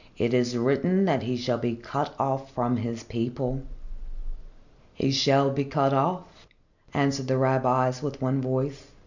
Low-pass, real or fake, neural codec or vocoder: 7.2 kHz; real; none